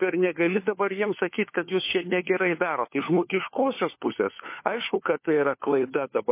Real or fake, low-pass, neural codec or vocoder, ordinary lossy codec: fake; 3.6 kHz; codec, 16 kHz, 4 kbps, FunCodec, trained on LibriTTS, 50 frames a second; MP3, 24 kbps